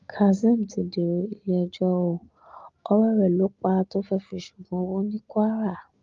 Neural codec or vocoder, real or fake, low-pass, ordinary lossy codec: none; real; 7.2 kHz; Opus, 32 kbps